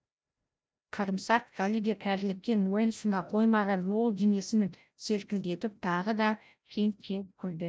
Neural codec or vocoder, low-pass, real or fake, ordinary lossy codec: codec, 16 kHz, 0.5 kbps, FreqCodec, larger model; none; fake; none